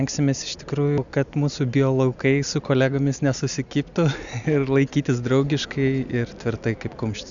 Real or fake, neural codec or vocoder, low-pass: real; none; 7.2 kHz